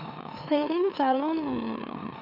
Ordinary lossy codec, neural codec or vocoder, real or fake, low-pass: none; autoencoder, 44.1 kHz, a latent of 192 numbers a frame, MeloTTS; fake; 5.4 kHz